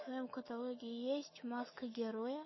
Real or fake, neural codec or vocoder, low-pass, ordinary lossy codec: fake; autoencoder, 48 kHz, 128 numbers a frame, DAC-VAE, trained on Japanese speech; 7.2 kHz; MP3, 24 kbps